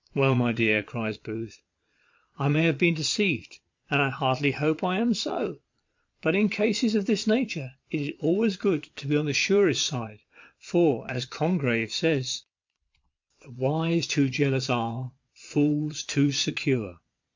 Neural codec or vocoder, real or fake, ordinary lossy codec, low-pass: vocoder, 22.05 kHz, 80 mel bands, Vocos; fake; MP3, 64 kbps; 7.2 kHz